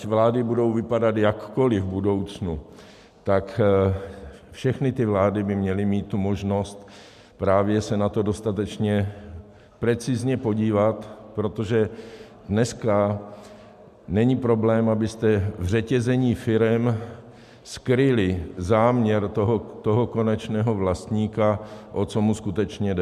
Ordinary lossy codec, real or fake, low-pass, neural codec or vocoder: MP3, 96 kbps; real; 14.4 kHz; none